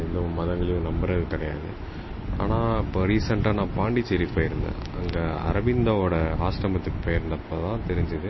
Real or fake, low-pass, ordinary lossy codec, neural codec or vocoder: real; 7.2 kHz; MP3, 24 kbps; none